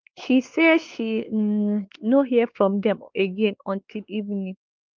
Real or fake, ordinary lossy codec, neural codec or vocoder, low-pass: fake; Opus, 24 kbps; codec, 16 kHz, 2 kbps, X-Codec, WavLM features, trained on Multilingual LibriSpeech; 7.2 kHz